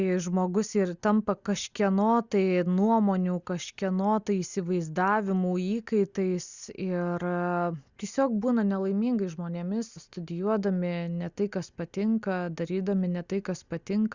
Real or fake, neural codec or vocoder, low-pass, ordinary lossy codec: real; none; 7.2 kHz; Opus, 64 kbps